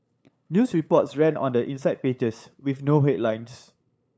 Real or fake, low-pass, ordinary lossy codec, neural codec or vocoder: fake; none; none; codec, 16 kHz, 8 kbps, FunCodec, trained on LibriTTS, 25 frames a second